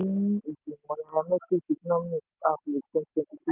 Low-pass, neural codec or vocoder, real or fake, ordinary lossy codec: 3.6 kHz; none; real; Opus, 32 kbps